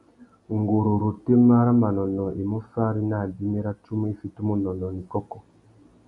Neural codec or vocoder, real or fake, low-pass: none; real; 10.8 kHz